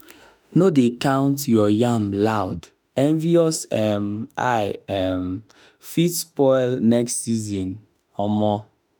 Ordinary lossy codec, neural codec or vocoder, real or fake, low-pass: none; autoencoder, 48 kHz, 32 numbers a frame, DAC-VAE, trained on Japanese speech; fake; none